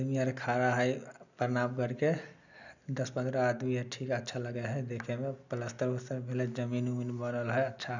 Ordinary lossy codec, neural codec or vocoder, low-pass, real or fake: none; none; 7.2 kHz; real